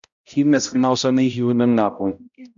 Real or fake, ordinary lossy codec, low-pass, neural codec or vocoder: fake; MP3, 64 kbps; 7.2 kHz; codec, 16 kHz, 0.5 kbps, X-Codec, HuBERT features, trained on balanced general audio